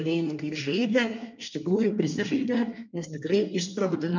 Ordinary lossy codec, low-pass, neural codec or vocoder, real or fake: MP3, 64 kbps; 7.2 kHz; codec, 24 kHz, 1 kbps, SNAC; fake